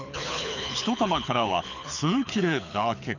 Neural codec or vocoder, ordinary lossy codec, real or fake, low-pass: codec, 24 kHz, 6 kbps, HILCodec; none; fake; 7.2 kHz